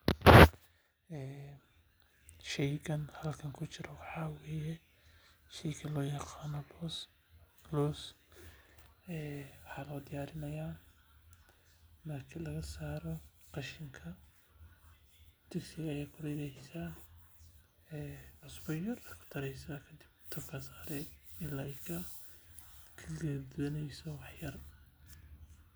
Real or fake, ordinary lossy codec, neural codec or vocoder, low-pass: real; none; none; none